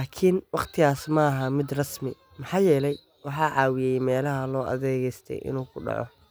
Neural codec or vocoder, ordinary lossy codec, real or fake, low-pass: none; none; real; none